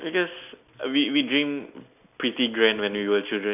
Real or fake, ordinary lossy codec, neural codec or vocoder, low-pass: real; none; none; 3.6 kHz